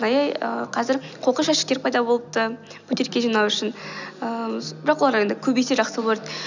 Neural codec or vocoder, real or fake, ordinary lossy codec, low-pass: none; real; none; 7.2 kHz